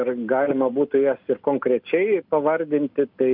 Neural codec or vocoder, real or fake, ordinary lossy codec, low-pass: none; real; MP3, 48 kbps; 5.4 kHz